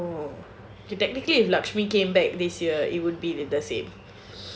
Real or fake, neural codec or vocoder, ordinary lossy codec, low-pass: real; none; none; none